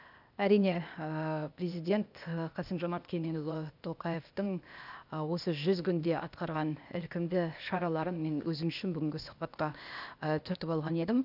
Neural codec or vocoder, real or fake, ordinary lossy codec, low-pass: codec, 16 kHz, 0.8 kbps, ZipCodec; fake; none; 5.4 kHz